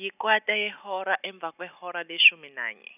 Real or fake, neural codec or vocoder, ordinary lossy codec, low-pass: real; none; none; 3.6 kHz